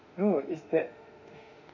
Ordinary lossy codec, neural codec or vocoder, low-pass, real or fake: none; autoencoder, 48 kHz, 32 numbers a frame, DAC-VAE, trained on Japanese speech; 7.2 kHz; fake